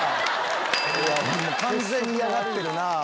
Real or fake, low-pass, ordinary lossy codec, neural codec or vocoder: real; none; none; none